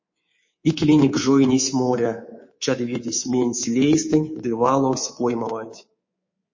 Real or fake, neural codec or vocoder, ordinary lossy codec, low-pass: fake; codec, 24 kHz, 3.1 kbps, DualCodec; MP3, 32 kbps; 7.2 kHz